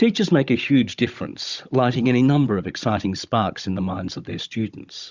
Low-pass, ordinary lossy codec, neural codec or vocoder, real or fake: 7.2 kHz; Opus, 64 kbps; codec, 16 kHz, 16 kbps, FunCodec, trained on Chinese and English, 50 frames a second; fake